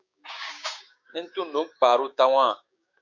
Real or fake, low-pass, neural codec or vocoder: fake; 7.2 kHz; codec, 16 kHz, 6 kbps, DAC